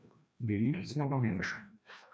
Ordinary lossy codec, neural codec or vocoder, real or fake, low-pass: none; codec, 16 kHz, 1 kbps, FreqCodec, larger model; fake; none